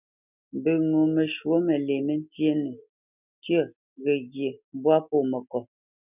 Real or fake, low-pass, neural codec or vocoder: real; 3.6 kHz; none